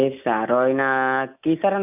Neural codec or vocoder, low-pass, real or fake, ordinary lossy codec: none; 3.6 kHz; real; none